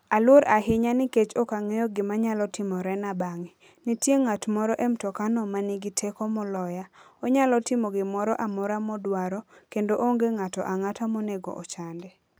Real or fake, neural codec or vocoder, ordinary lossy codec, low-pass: real; none; none; none